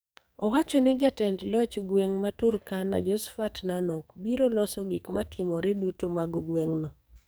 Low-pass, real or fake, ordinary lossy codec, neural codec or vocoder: none; fake; none; codec, 44.1 kHz, 2.6 kbps, SNAC